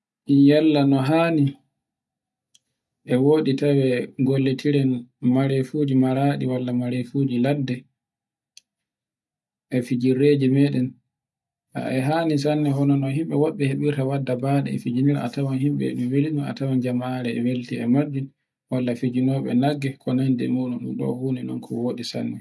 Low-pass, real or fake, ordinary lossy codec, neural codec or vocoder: none; real; none; none